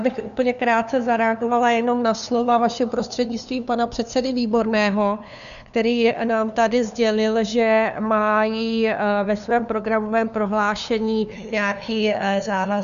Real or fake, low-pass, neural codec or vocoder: fake; 7.2 kHz; codec, 16 kHz, 2 kbps, FunCodec, trained on LibriTTS, 25 frames a second